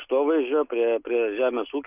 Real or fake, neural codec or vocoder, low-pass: real; none; 3.6 kHz